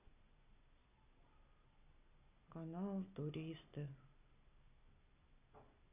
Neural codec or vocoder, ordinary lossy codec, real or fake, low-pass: none; none; real; 3.6 kHz